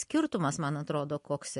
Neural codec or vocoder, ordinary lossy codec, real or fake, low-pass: none; MP3, 48 kbps; real; 10.8 kHz